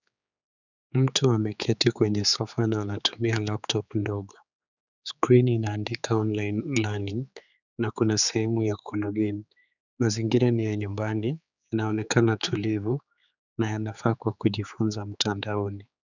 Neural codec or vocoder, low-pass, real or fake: codec, 16 kHz, 4 kbps, X-Codec, HuBERT features, trained on general audio; 7.2 kHz; fake